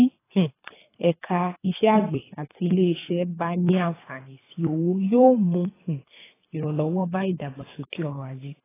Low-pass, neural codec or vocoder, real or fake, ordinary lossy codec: 3.6 kHz; codec, 24 kHz, 3 kbps, HILCodec; fake; AAC, 16 kbps